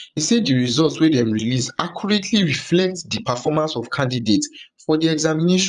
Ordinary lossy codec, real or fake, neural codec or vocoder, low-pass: none; fake; vocoder, 22.05 kHz, 80 mel bands, Vocos; 9.9 kHz